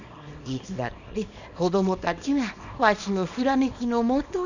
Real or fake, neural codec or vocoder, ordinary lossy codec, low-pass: fake; codec, 24 kHz, 0.9 kbps, WavTokenizer, small release; none; 7.2 kHz